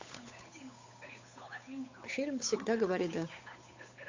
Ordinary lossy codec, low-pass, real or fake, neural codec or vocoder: AAC, 48 kbps; 7.2 kHz; fake; codec, 16 kHz, 8 kbps, FunCodec, trained on Chinese and English, 25 frames a second